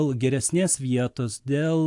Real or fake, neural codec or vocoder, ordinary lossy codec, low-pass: real; none; AAC, 64 kbps; 10.8 kHz